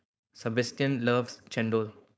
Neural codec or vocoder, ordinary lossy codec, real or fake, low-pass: codec, 16 kHz, 4.8 kbps, FACodec; none; fake; none